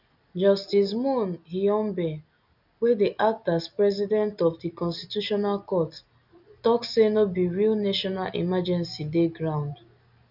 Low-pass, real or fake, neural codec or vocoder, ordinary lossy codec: 5.4 kHz; real; none; none